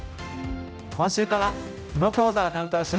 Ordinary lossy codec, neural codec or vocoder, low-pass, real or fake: none; codec, 16 kHz, 0.5 kbps, X-Codec, HuBERT features, trained on general audio; none; fake